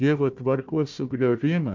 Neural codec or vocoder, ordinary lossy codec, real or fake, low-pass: codec, 16 kHz, 1 kbps, FunCodec, trained on Chinese and English, 50 frames a second; MP3, 64 kbps; fake; 7.2 kHz